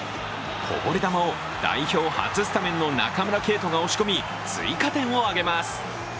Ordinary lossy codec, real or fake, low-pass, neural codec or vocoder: none; real; none; none